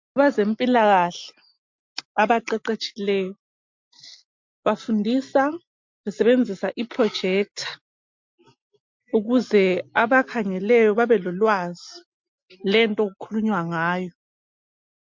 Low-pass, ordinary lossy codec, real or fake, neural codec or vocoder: 7.2 kHz; MP3, 48 kbps; real; none